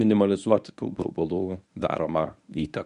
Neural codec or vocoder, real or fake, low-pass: codec, 24 kHz, 0.9 kbps, WavTokenizer, medium speech release version 1; fake; 10.8 kHz